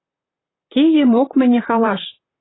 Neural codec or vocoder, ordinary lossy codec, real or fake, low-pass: vocoder, 44.1 kHz, 128 mel bands, Pupu-Vocoder; AAC, 16 kbps; fake; 7.2 kHz